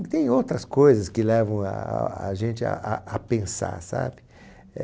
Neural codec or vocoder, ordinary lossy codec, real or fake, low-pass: none; none; real; none